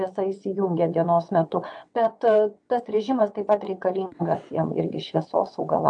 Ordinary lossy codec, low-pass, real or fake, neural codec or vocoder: AAC, 64 kbps; 9.9 kHz; fake; vocoder, 22.05 kHz, 80 mel bands, WaveNeXt